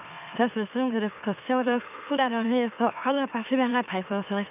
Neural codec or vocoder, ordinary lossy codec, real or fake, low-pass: autoencoder, 44.1 kHz, a latent of 192 numbers a frame, MeloTTS; none; fake; 3.6 kHz